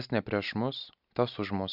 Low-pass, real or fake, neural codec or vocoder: 5.4 kHz; real; none